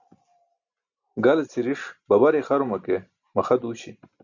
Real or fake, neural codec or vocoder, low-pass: real; none; 7.2 kHz